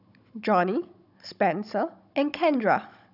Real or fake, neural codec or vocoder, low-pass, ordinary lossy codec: fake; codec, 16 kHz, 16 kbps, FunCodec, trained on Chinese and English, 50 frames a second; 5.4 kHz; none